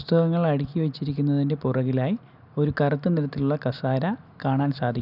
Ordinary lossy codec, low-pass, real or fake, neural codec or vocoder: none; 5.4 kHz; real; none